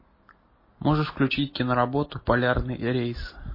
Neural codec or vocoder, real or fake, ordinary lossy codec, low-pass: none; real; MP3, 24 kbps; 5.4 kHz